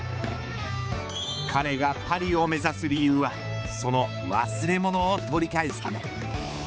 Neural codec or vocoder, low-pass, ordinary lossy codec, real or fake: codec, 16 kHz, 4 kbps, X-Codec, HuBERT features, trained on balanced general audio; none; none; fake